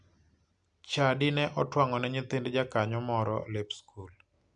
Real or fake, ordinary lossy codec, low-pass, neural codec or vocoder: real; none; 9.9 kHz; none